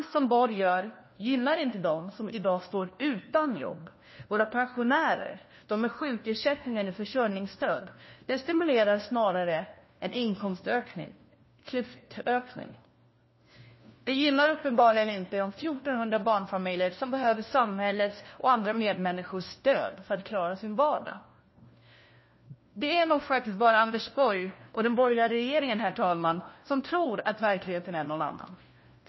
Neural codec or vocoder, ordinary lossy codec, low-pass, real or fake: codec, 16 kHz, 1 kbps, FunCodec, trained on LibriTTS, 50 frames a second; MP3, 24 kbps; 7.2 kHz; fake